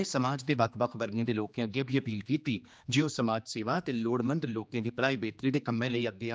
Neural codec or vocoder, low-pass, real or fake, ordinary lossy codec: codec, 16 kHz, 2 kbps, X-Codec, HuBERT features, trained on general audio; none; fake; none